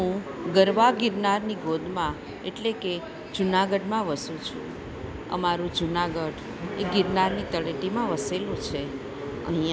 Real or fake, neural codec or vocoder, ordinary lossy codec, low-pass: real; none; none; none